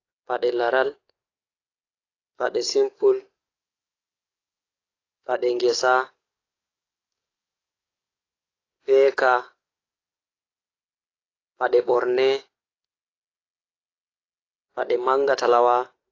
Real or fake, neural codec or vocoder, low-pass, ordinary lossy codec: fake; codec, 44.1 kHz, 7.8 kbps, DAC; 7.2 kHz; AAC, 32 kbps